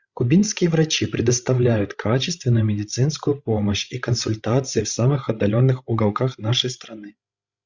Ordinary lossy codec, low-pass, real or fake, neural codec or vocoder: Opus, 64 kbps; 7.2 kHz; fake; codec, 16 kHz, 16 kbps, FreqCodec, larger model